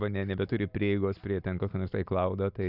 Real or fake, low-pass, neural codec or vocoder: real; 5.4 kHz; none